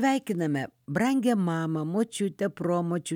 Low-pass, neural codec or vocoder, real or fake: 19.8 kHz; none; real